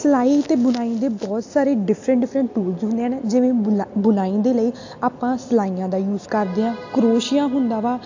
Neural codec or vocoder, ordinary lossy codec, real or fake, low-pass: none; AAC, 48 kbps; real; 7.2 kHz